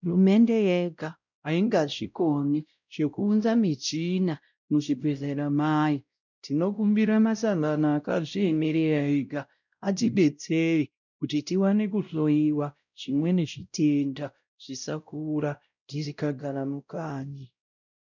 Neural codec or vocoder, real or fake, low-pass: codec, 16 kHz, 0.5 kbps, X-Codec, WavLM features, trained on Multilingual LibriSpeech; fake; 7.2 kHz